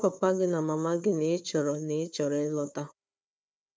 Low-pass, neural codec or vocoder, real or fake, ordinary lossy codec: none; codec, 16 kHz, 4 kbps, FunCodec, trained on Chinese and English, 50 frames a second; fake; none